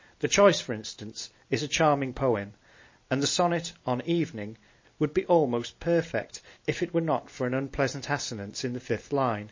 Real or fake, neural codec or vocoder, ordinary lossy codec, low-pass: real; none; MP3, 32 kbps; 7.2 kHz